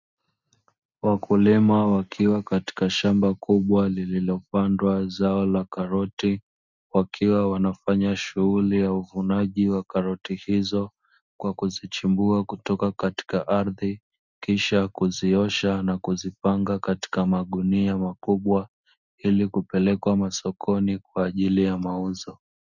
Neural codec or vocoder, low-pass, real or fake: none; 7.2 kHz; real